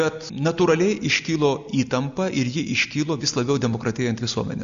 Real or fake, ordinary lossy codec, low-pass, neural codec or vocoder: real; Opus, 64 kbps; 7.2 kHz; none